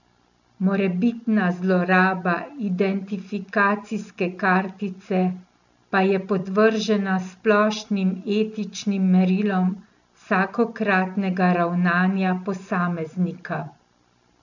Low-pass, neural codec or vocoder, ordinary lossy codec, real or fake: 7.2 kHz; none; none; real